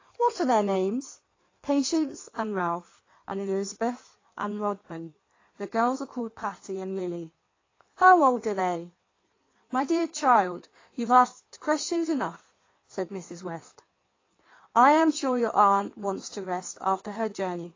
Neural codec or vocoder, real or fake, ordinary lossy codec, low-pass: codec, 16 kHz in and 24 kHz out, 1.1 kbps, FireRedTTS-2 codec; fake; AAC, 32 kbps; 7.2 kHz